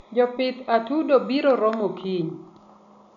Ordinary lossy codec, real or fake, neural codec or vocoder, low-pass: none; real; none; 7.2 kHz